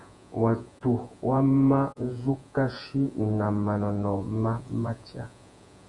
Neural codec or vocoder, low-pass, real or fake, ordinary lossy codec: vocoder, 48 kHz, 128 mel bands, Vocos; 10.8 kHz; fake; Opus, 64 kbps